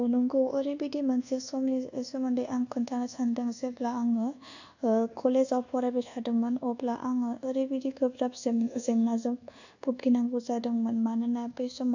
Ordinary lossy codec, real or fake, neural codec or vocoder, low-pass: none; fake; codec, 24 kHz, 1.2 kbps, DualCodec; 7.2 kHz